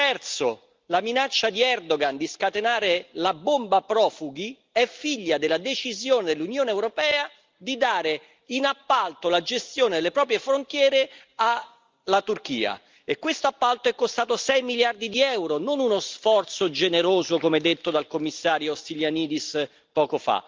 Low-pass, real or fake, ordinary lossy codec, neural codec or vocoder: 7.2 kHz; real; Opus, 32 kbps; none